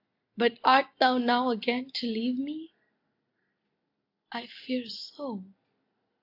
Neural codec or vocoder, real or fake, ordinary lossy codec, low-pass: none; real; AAC, 32 kbps; 5.4 kHz